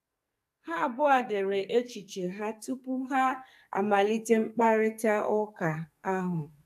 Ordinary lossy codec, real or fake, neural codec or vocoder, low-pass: AAC, 96 kbps; fake; codec, 44.1 kHz, 2.6 kbps, SNAC; 14.4 kHz